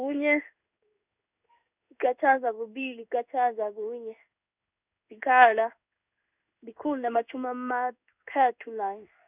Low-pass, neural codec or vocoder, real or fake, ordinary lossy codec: 3.6 kHz; codec, 16 kHz in and 24 kHz out, 1 kbps, XY-Tokenizer; fake; none